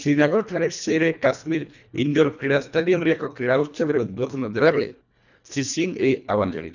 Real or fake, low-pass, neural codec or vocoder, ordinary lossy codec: fake; 7.2 kHz; codec, 24 kHz, 1.5 kbps, HILCodec; none